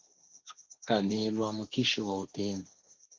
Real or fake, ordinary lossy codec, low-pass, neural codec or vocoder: fake; Opus, 32 kbps; 7.2 kHz; codec, 16 kHz, 1.1 kbps, Voila-Tokenizer